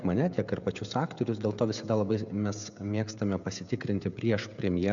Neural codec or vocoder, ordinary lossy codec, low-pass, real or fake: codec, 16 kHz, 16 kbps, FreqCodec, smaller model; AAC, 64 kbps; 7.2 kHz; fake